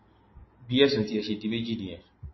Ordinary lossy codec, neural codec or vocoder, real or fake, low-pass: MP3, 24 kbps; none; real; 7.2 kHz